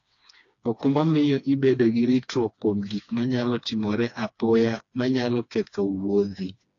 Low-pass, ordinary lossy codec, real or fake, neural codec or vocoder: 7.2 kHz; AAC, 48 kbps; fake; codec, 16 kHz, 2 kbps, FreqCodec, smaller model